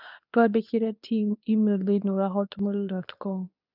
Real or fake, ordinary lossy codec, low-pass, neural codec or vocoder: fake; none; 5.4 kHz; codec, 24 kHz, 0.9 kbps, WavTokenizer, medium speech release version 2